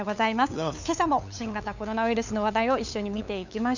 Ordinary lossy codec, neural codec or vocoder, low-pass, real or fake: none; codec, 16 kHz, 8 kbps, FunCodec, trained on LibriTTS, 25 frames a second; 7.2 kHz; fake